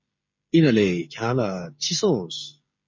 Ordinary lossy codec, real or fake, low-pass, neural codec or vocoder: MP3, 32 kbps; fake; 7.2 kHz; codec, 16 kHz, 16 kbps, FreqCodec, smaller model